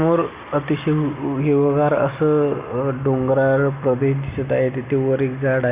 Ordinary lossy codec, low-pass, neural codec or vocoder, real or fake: Opus, 64 kbps; 3.6 kHz; none; real